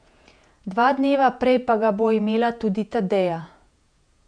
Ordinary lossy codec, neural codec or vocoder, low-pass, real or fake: none; vocoder, 48 kHz, 128 mel bands, Vocos; 9.9 kHz; fake